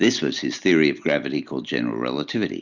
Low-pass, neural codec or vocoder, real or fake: 7.2 kHz; none; real